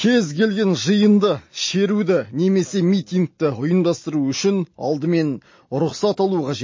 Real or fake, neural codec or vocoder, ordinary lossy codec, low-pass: real; none; MP3, 32 kbps; 7.2 kHz